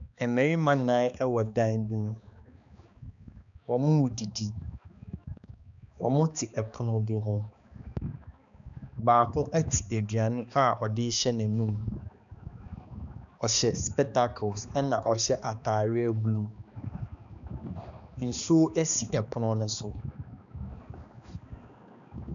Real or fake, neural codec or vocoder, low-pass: fake; codec, 16 kHz, 2 kbps, X-Codec, HuBERT features, trained on balanced general audio; 7.2 kHz